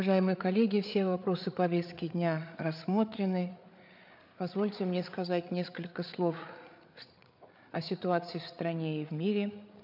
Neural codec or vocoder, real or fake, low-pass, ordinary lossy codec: codec, 16 kHz, 8 kbps, FreqCodec, larger model; fake; 5.4 kHz; MP3, 48 kbps